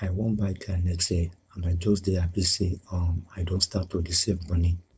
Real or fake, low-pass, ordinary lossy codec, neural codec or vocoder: fake; none; none; codec, 16 kHz, 4.8 kbps, FACodec